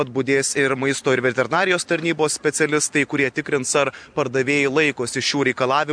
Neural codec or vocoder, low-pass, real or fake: vocoder, 44.1 kHz, 128 mel bands every 256 samples, BigVGAN v2; 9.9 kHz; fake